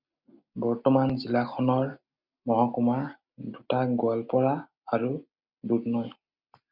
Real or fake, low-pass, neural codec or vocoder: real; 5.4 kHz; none